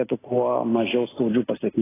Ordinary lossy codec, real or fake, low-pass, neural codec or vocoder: AAC, 16 kbps; real; 3.6 kHz; none